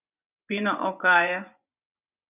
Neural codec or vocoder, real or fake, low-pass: vocoder, 24 kHz, 100 mel bands, Vocos; fake; 3.6 kHz